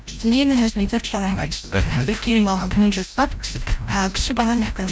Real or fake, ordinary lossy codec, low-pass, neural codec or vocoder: fake; none; none; codec, 16 kHz, 0.5 kbps, FreqCodec, larger model